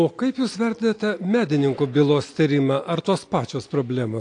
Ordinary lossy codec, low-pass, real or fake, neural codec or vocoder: AAC, 48 kbps; 9.9 kHz; real; none